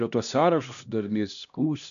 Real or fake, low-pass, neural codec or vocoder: fake; 7.2 kHz; codec, 16 kHz, 1 kbps, X-Codec, HuBERT features, trained on LibriSpeech